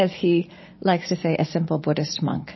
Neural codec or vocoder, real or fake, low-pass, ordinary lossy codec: none; real; 7.2 kHz; MP3, 24 kbps